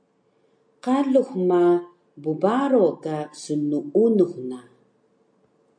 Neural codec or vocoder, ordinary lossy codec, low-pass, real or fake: none; MP3, 48 kbps; 9.9 kHz; real